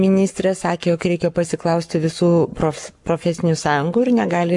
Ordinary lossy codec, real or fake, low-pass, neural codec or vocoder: MP3, 64 kbps; fake; 10.8 kHz; codec, 44.1 kHz, 7.8 kbps, Pupu-Codec